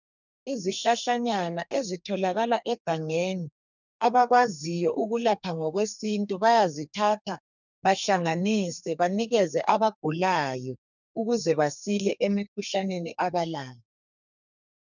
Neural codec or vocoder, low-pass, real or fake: codec, 32 kHz, 1.9 kbps, SNAC; 7.2 kHz; fake